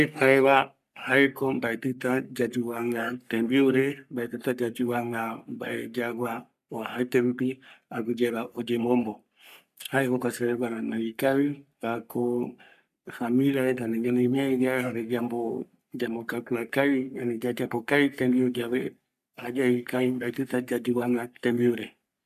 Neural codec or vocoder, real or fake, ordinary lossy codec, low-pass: codec, 44.1 kHz, 3.4 kbps, Pupu-Codec; fake; MP3, 96 kbps; 14.4 kHz